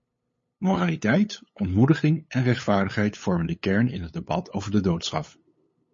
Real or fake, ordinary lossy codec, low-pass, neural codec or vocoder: fake; MP3, 32 kbps; 7.2 kHz; codec, 16 kHz, 8 kbps, FunCodec, trained on LibriTTS, 25 frames a second